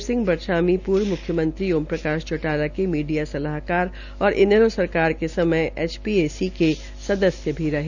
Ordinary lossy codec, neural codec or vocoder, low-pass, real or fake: none; none; 7.2 kHz; real